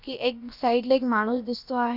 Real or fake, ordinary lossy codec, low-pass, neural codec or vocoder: fake; Opus, 64 kbps; 5.4 kHz; codec, 16 kHz, about 1 kbps, DyCAST, with the encoder's durations